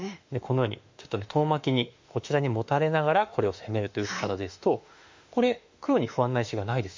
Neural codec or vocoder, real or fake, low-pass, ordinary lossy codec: autoencoder, 48 kHz, 32 numbers a frame, DAC-VAE, trained on Japanese speech; fake; 7.2 kHz; MP3, 48 kbps